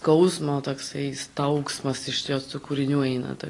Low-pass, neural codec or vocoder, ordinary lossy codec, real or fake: 10.8 kHz; none; AAC, 32 kbps; real